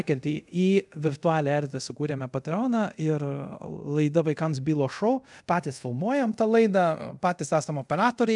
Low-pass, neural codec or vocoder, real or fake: 10.8 kHz; codec, 24 kHz, 0.5 kbps, DualCodec; fake